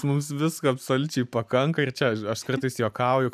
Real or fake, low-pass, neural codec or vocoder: fake; 14.4 kHz; vocoder, 44.1 kHz, 128 mel bands every 512 samples, BigVGAN v2